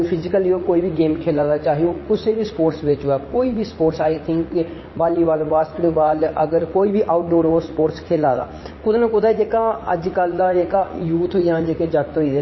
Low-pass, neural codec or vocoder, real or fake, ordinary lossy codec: 7.2 kHz; vocoder, 44.1 kHz, 80 mel bands, Vocos; fake; MP3, 24 kbps